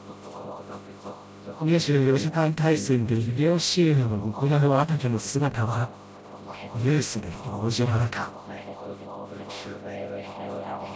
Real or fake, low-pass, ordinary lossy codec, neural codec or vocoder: fake; none; none; codec, 16 kHz, 0.5 kbps, FreqCodec, smaller model